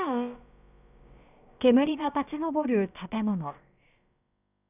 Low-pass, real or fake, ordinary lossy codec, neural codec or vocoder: 3.6 kHz; fake; none; codec, 16 kHz, about 1 kbps, DyCAST, with the encoder's durations